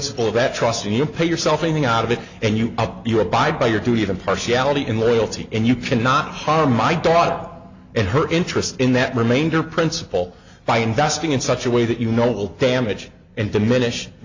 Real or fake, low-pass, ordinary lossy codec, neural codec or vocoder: real; 7.2 kHz; AAC, 48 kbps; none